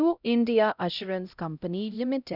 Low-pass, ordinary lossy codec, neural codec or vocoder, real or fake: 5.4 kHz; none; codec, 16 kHz, 0.5 kbps, X-Codec, HuBERT features, trained on LibriSpeech; fake